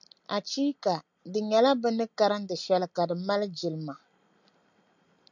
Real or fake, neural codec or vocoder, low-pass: real; none; 7.2 kHz